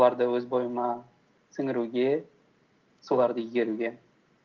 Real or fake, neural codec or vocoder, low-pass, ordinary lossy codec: real; none; 7.2 kHz; Opus, 24 kbps